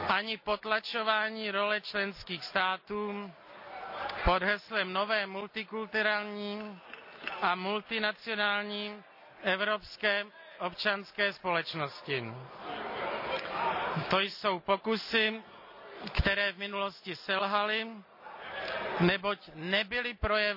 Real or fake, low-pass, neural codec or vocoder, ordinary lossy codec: real; 5.4 kHz; none; AAC, 48 kbps